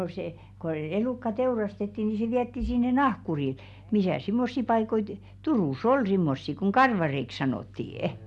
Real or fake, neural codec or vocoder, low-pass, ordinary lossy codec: real; none; none; none